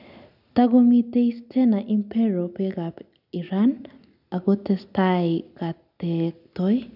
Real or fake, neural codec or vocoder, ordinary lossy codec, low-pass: real; none; none; 5.4 kHz